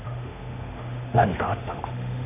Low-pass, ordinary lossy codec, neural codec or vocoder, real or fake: 3.6 kHz; none; autoencoder, 48 kHz, 32 numbers a frame, DAC-VAE, trained on Japanese speech; fake